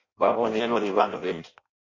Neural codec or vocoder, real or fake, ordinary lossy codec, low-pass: codec, 16 kHz in and 24 kHz out, 0.6 kbps, FireRedTTS-2 codec; fake; MP3, 64 kbps; 7.2 kHz